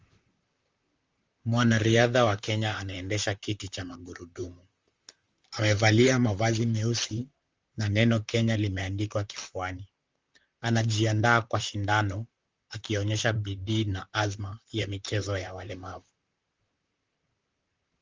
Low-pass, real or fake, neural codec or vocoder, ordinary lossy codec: 7.2 kHz; fake; vocoder, 44.1 kHz, 128 mel bands, Pupu-Vocoder; Opus, 32 kbps